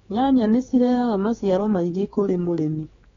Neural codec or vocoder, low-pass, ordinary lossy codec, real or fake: codec, 16 kHz, 4 kbps, X-Codec, HuBERT features, trained on general audio; 7.2 kHz; AAC, 24 kbps; fake